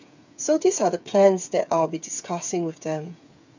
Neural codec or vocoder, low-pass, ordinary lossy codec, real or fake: codec, 16 kHz, 8 kbps, FreqCodec, smaller model; 7.2 kHz; none; fake